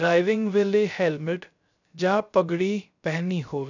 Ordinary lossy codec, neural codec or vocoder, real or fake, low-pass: none; codec, 16 kHz, 0.3 kbps, FocalCodec; fake; 7.2 kHz